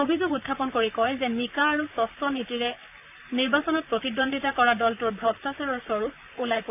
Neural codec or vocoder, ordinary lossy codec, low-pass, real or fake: vocoder, 44.1 kHz, 128 mel bands, Pupu-Vocoder; none; 3.6 kHz; fake